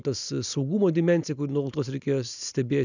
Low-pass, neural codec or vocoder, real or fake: 7.2 kHz; none; real